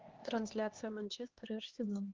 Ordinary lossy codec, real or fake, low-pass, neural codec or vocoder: Opus, 16 kbps; fake; 7.2 kHz; codec, 16 kHz, 2 kbps, X-Codec, HuBERT features, trained on LibriSpeech